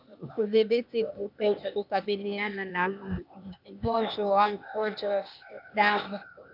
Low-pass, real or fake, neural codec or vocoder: 5.4 kHz; fake; codec, 16 kHz, 0.8 kbps, ZipCodec